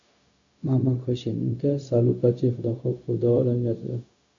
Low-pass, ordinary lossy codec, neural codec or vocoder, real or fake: 7.2 kHz; AAC, 48 kbps; codec, 16 kHz, 0.4 kbps, LongCat-Audio-Codec; fake